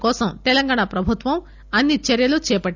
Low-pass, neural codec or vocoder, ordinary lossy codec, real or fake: 7.2 kHz; none; none; real